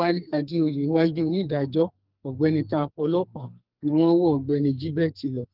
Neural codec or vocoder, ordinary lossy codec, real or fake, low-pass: codec, 44.1 kHz, 2.6 kbps, SNAC; Opus, 24 kbps; fake; 5.4 kHz